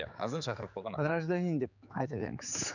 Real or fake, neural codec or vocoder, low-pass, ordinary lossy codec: fake; codec, 16 kHz, 4 kbps, X-Codec, HuBERT features, trained on general audio; 7.2 kHz; none